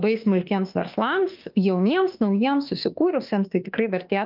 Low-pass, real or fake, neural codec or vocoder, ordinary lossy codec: 5.4 kHz; fake; autoencoder, 48 kHz, 32 numbers a frame, DAC-VAE, trained on Japanese speech; Opus, 24 kbps